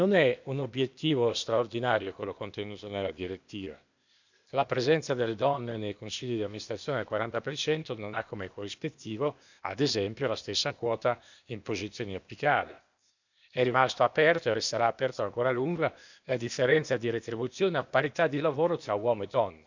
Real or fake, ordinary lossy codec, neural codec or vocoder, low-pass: fake; none; codec, 16 kHz, 0.8 kbps, ZipCodec; 7.2 kHz